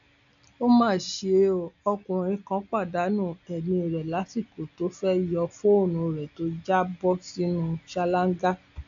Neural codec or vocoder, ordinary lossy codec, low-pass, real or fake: none; none; 7.2 kHz; real